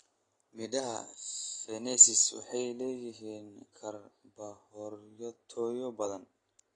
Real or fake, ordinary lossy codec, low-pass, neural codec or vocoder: real; AAC, 32 kbps; 10.8 kHz; none